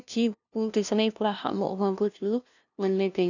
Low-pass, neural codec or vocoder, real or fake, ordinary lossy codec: 7.2 kHz; codec, 16 kHz, 0.5 kbps, FunCodec, trained on LibriTTS, 25 frames a second; fake; none